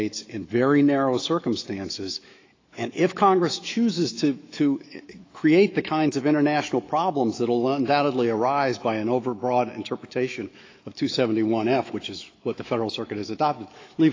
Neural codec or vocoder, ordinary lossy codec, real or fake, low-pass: autoencoder, 48 kHz, 128 numbers a frame, DAC-VAE, trained on Japanese speech; AAC, 32 kbps; fake; 7.2 kHz